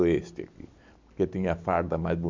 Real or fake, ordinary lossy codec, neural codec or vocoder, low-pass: real; MP3, 64 kbps; none; 7.2 kHz